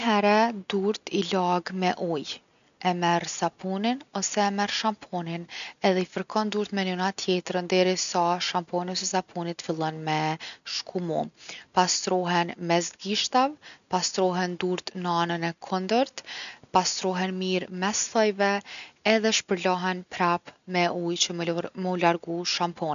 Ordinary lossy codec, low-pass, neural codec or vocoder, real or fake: none; 7.2 kHz; none; real